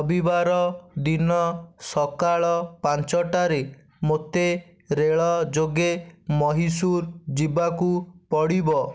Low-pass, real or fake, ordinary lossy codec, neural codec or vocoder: none; real; none; none